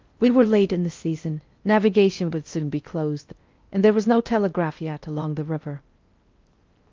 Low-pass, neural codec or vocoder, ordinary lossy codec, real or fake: 7.2 kHz; codec, 16 kHz in and 24 kHz out, 0.6 kbps, FocalCodec, streaming, 4096 codes; Opus, 32 kbps; fake